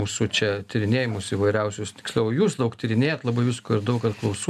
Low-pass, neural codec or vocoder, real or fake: 14.4 kHz; none; real